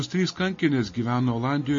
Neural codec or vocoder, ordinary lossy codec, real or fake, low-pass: none; MP3, 32 kbps; real; 7.2 kHz